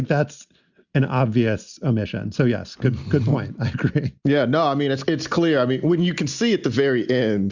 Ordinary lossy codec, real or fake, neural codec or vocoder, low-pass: Opus, 64 kbps; real; none; 7.2 kHz